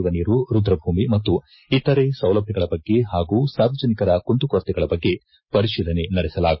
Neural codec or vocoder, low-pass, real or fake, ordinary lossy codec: none; 7.2 kHz; real; MP3, 24 kbps